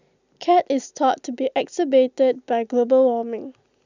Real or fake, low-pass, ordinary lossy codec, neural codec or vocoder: real; 7.2 kHz; none; none